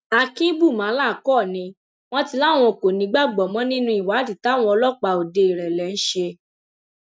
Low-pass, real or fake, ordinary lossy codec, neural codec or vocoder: none; real; none; none